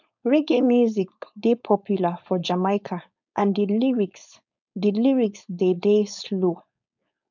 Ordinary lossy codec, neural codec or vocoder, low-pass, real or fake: none; codec, 16 kHz, 4.8 kbps, FACodec; 7.2 kHz; fake